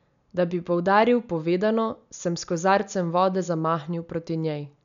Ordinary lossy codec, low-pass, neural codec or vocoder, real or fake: none; 7.2 kHz; none; real